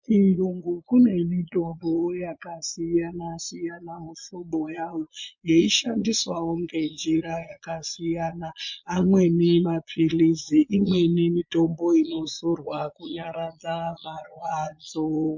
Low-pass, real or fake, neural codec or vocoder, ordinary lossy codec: 7.2 kHz; fake; vocoder, 22.05 kHz, 80 mel bands, Vocos; MP3, 48 kbps